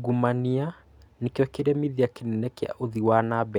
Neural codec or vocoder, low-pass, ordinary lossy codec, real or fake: none; 19.8 kHz; none; real